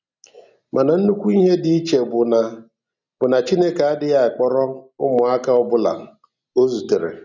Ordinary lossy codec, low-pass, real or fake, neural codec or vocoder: none; 7.2 kHz; real; none